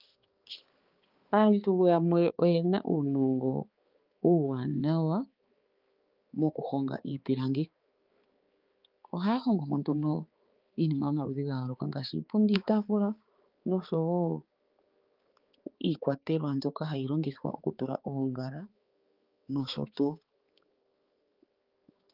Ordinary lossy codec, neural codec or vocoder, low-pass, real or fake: Opus, 32 kbps; codec, 16 kHz, 4 kbps, X-Codec, HuBERT features, trained on balanced general audio; 5.4 kHz; fake